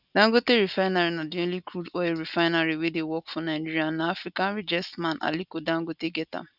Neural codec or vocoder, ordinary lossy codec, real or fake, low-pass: none; none; real; 5.4 kHz